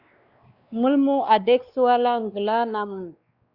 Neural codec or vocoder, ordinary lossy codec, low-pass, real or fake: codec, 16 kHz, 2 kbps, X-Codec, WavLM features, trained on Multilingual LibriSpeech; Opus, 64 kbps; 5.4 kHz; fake